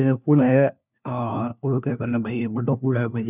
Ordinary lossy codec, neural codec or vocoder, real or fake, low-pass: none; codec, 16 kHz, 1 kbps, FunCodec, trained on LibriTTS, 50 frames a second; fake; 3.6 kHz